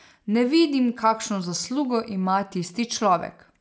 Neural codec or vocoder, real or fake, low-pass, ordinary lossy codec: none; real; none; none